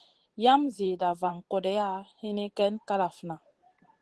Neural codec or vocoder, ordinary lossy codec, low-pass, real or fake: vocoder, 44.1 kHz, 128 mel bands every 512 samples, BigVGAN v2; Opus, 16 kbps; 10.8 kHz; fake